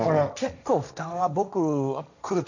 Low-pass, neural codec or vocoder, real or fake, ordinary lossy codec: 7.2 kHz; codec, 16 kHz, 1.1 kbps, Voila-Tokenizer; fake; none